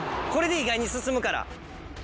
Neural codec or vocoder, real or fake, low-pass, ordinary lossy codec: none; real; none; none